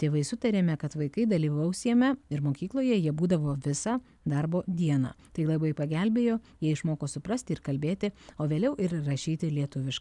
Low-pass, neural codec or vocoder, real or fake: 10.8 kHz; none; real